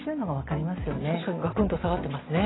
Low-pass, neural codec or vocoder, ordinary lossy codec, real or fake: 7.2 kHz; none; AAC, 16 kbps; real